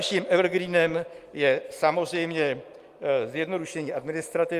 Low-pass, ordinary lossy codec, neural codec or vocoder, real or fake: 14.4 kHz; Opus, 32 kbps; none; real